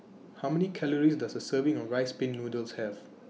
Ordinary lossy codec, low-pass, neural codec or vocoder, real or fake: none; none; none; real